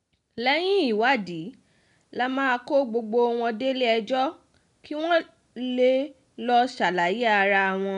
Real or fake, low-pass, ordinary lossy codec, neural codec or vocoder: real; 10.8 kHz; none; none